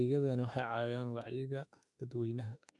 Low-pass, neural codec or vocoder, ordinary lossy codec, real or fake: 10.8 kHz; autoencoder, 48 kHz, 32 numbers a frame, DAC-VAE, trained on Japanese speech; none; fake